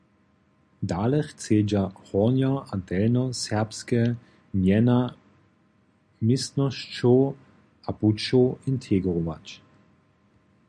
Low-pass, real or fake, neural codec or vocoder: 9.9 kHz; real; none